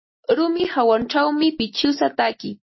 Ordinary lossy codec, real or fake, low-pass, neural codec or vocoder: MP3, 24 kbps; real; 7.2 kHz; none